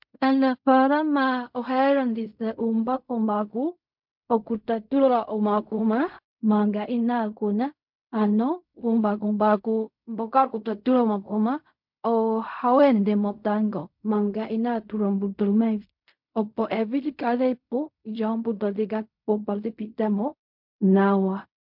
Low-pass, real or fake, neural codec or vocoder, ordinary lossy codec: 5.4 kHz; fake; codec, 16 kHz in and 24 kHz out, 0.4 kbps, LongCat-Audio-Codec, fine tuned four codebook decoder; MP3, 48 kbps